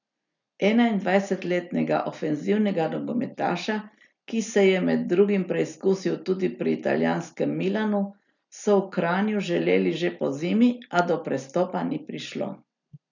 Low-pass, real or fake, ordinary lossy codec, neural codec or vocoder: 7.2 kHz; real; none; none